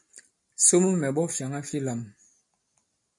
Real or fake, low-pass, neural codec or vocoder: real; 10.8 kHz; none